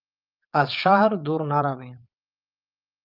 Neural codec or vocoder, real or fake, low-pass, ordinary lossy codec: vocoder, 22.05 kHz, 80 mel bands, Vocos; fake; 5.4 kHz; Opus, 24 kbps